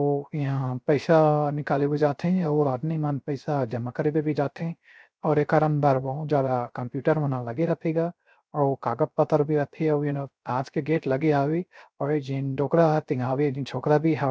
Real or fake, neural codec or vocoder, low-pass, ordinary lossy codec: fake; codec, 16 kHz, 0.3 kbps, FocalCodec; none; none